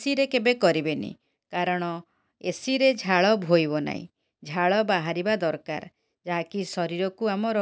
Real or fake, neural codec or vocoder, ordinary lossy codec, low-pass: real; none; none; none